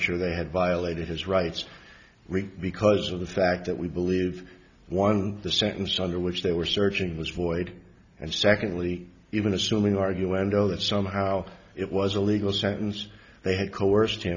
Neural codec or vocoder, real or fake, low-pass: none; real; 7.2 kHz